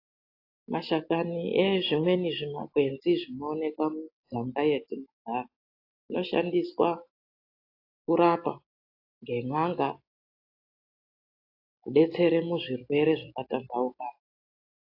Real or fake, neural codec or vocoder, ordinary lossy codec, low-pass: real; none; AAC, 32 kbps; 5.4 kHz